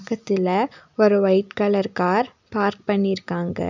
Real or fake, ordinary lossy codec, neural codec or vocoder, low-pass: real; none; none; 7.2 kHz